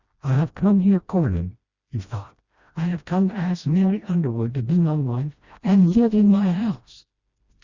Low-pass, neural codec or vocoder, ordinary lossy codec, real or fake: 7.2 kHz; codec, 16 kHz, 1 kbps, FreqCodec, smaller model; Opus, 64 kbps; fake